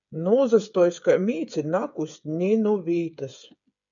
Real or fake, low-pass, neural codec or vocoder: fake; 7.2 kHz; codec, 16 kHz, 8 kbps, FreqCodec, smaller model